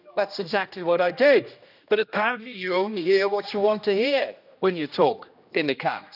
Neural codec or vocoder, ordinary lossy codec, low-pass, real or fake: codec, 16 kHz, 1 kbps, X-Codec, HuBERT features, trained on general audio; none; 5.4 kHz; fake